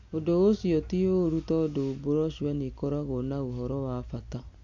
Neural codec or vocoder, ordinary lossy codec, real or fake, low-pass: none; MP3, 48 kbps; real; 7.2 kHz